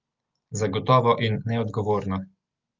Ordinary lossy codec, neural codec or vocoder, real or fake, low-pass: Opus, 32 kbps; none; real; 7.2 kHz